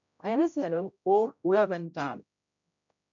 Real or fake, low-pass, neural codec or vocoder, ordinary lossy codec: fake; 7.2 kHz; codec, 16 kHz, 0.5 kbps, X-Codec, HuBERT features, trained on general audio; MP3, 64 kbps